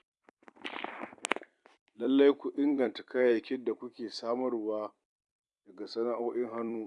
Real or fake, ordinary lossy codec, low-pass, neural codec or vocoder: fake; none; 10.8 kHz; vocoder, 44.1 kHz, 128 mel bands every 256 samples, BigVGAN v2